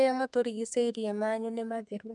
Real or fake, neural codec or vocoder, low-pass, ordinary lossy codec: fake; codec, 32 kHz, 1.9 kbps, SNAC; 10.8 kHz; none